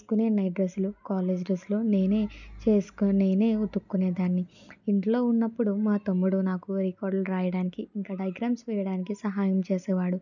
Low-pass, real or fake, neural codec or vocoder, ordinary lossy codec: 7.2 kHz; real; none; none